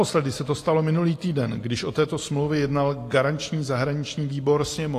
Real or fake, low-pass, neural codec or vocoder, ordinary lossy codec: real; 14.4 kHz; none; AAC, 48 kbps